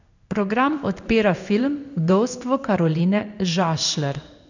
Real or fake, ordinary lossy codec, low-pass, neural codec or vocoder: fake; none; 7.2 kHz; codec, 16 kHz in and 24 kHz out, 1 kbps, XY-Tokenizer